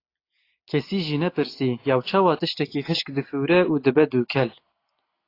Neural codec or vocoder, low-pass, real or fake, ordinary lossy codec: none; 5.4 kHz; real; AAC, 32 kbps